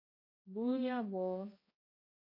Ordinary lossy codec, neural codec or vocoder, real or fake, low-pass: MP3, 24 kbps; codec, 16 kHz, 1 kbps, X-Codec, HuBERT features, trained on balanced general audio; fake; 5.4 kHz